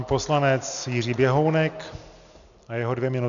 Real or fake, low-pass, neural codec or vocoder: real; 7.2 kHz; none